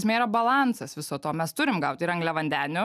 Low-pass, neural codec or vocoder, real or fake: 14.4 kHz; none; real